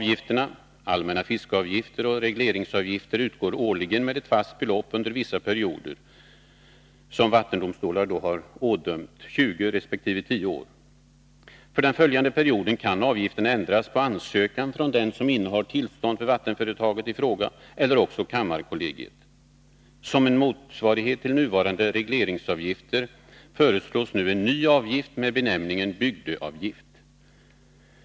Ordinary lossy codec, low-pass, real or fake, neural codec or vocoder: none; none; real; none